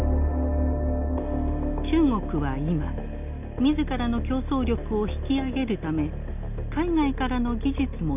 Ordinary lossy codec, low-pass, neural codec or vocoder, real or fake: none; 3.6 kHz; none; real